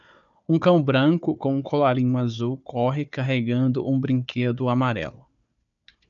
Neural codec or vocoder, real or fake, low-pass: codec, 16 kHz, 4 kbps, FunCodec, trained on Chinese and English, 50 frames a second; fake; 7.2 kHz